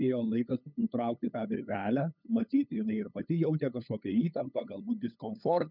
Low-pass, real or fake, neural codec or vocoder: 5.4 kHz; fake; codec, 16 kHz, 8 kbps, FunCodec, trained on LibriTTS, 25 frames a second